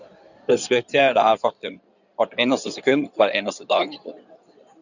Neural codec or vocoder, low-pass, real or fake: codec, 16 kHz in and 24 kHz out, 2.2 kbps, FireRedTTS-2 codec; 7.2 kHz; fake